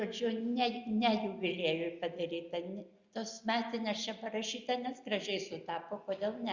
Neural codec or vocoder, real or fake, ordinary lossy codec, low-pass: none; real; Opus, 64 kbps; 7.2 kHz